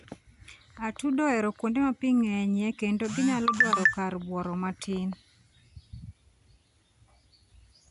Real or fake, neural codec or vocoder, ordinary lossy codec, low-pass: real; none; MP3, 96 kbps; 10.8 kHz